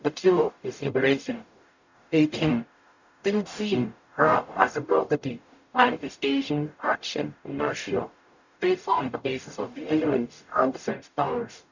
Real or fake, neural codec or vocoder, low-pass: fake; codec, 44.1 kHz, 0.9 kbps, DAC; 7.2 kHz